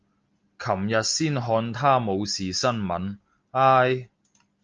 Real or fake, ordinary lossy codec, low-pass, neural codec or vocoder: real; Opus, 24 kbps; 7.2 kHz; none